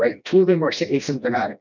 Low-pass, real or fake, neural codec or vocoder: 7.2 kHz; fake; codec, 16 kHz, 1 kbps, FreqCodec, smaller model